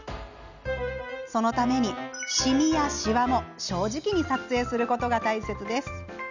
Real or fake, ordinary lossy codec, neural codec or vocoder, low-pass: real; none; none; 7.2 kHz